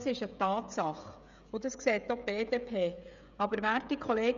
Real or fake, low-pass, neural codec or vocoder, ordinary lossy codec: fake; 7.2 kHz; codec, 16 kHz, 16 kbps, FreqCodec, smaller model; none